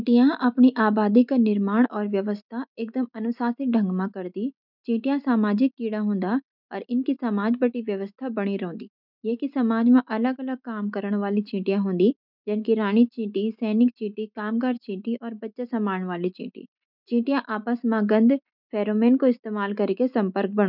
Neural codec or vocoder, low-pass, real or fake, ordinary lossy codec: none; 5.4 kHz; real; none